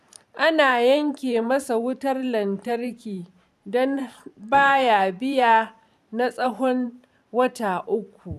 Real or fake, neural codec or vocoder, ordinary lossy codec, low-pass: fake; vocoder, 44.1 kHz, 128 mel bands every 512 samples, BigVGAN v2; none; 14.4 kHz